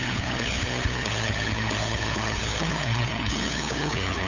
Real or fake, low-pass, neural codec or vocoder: fake; 7.2 kHz; codec, 16 kHz, 8 kbps, FunCodec, trained on LibriTTS, 25 frames a second